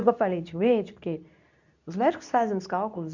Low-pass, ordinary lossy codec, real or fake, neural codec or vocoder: 7.2 kHz; none; fake; codec, 24 kHz, 0.9 kbps, WavTokenizer, medium speech release version 2